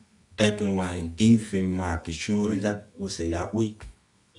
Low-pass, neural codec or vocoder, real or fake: 10.8 kHz; codec, 24 kHz, 0.9 kbps, WavTokenizer, medium music audio release; fake